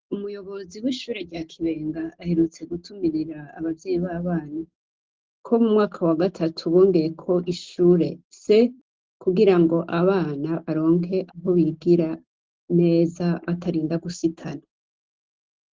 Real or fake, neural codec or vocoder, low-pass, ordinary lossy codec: real; none; 7.2 kHz; Opus, 16 kbps